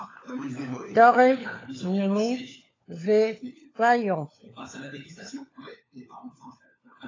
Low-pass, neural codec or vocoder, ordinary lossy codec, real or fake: 7.2 kHz; codec, 16 kHz, 4 kbps, FunCodec, trained on LibriTTS, 50 frames a second; AAC, 32 kbps; fake